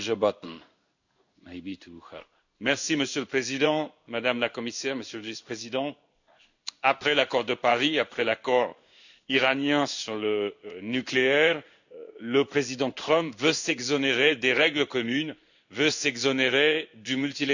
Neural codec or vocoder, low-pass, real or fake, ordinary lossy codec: codec, 16 kHz in and 24 kHz out, 1 kbps, XY-Tokenizer; 7.2 kHz; fake; none